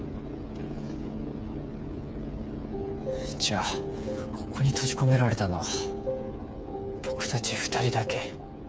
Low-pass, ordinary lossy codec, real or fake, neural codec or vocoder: none; none; fake; codec, 16 kHz, 8 kbps, FreqCodec, smaller model